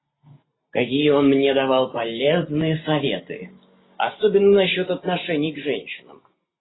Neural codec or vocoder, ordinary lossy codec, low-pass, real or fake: none; AAC, 16 kbps; 7.2 kHz; real